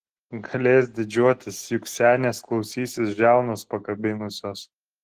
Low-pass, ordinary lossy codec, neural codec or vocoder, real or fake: 14.4 kHz; Opus, 16 kbps; none; real